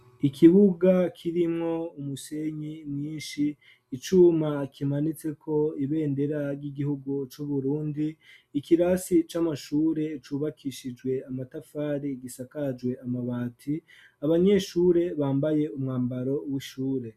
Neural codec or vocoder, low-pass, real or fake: none; 14.4 kHz; real